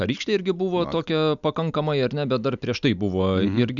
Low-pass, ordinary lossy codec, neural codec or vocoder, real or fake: 7.2 kHz; MP3, 96 kbps; none; real